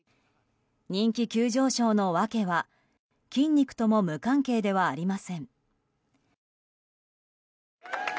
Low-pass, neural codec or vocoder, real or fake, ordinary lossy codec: none; none; real; none